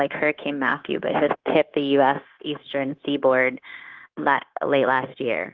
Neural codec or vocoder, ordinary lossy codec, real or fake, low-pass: codec, 16 kHz, 0.9 kbps, LongCat-Audio-Codec; Opus, 16 kbps; fake; 7.2 kHz